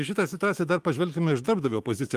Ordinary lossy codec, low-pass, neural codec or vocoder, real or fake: Opus, 24 kbps; 14.4 kHz; autoencoder, 48 kHz, 128 numbers a frame, DAC-VAE, trained on Japanese speech; fake